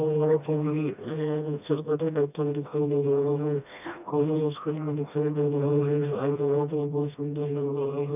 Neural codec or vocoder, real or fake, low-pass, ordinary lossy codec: codec, 16 kHz, 1 kbps, FreqCodec, smaller model; fake; 3.6 kHz; none